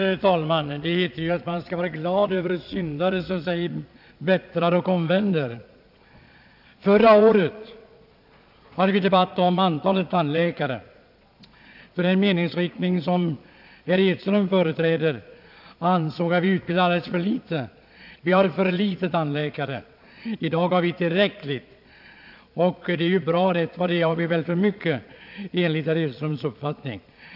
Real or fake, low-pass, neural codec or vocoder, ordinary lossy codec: fake; 5.4 kHz; vocoder, 22.05 kHz, 80 mel bands, Vocos; AAC, 48 kbps